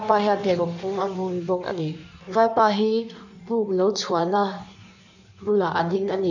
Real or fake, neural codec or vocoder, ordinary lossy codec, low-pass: fake; codec, 16 kHz in and 24 kHz out, 1.1 kbps, FireRedTTS-2 codec; none; 7.2 kHz